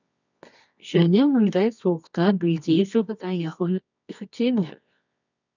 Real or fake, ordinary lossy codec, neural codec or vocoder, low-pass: fake; none; codec, 24 kHz, 0.9 kbps, WavTokenizer, medium music audio release; 7.2 kHz